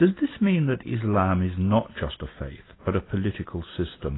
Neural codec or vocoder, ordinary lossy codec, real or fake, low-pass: none; AAC, 16 kbps; real; 7.2 kHz